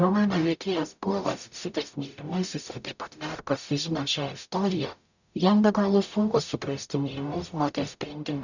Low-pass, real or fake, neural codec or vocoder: 7.2 kHz; fake; codec, 44.1 kHz, 0.9 kbps, DAC